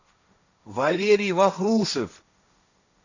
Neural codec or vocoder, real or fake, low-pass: codec, 16 kHz, 1.1 kbps, Voila-Tokenizer; fake; 7.2 kHz